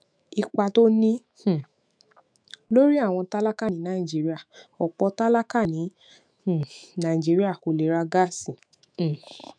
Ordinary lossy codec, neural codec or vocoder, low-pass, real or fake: none; autoencoder, 48 kHz, 128 numbers a frame, DAC-VAE, trained on Japanese speech; 9.9 kHz; fake